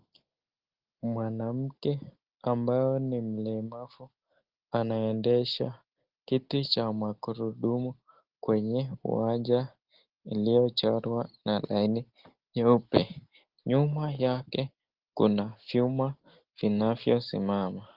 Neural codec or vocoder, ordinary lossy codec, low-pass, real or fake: none; Opus, 16 kbps; 5.4 kHz; real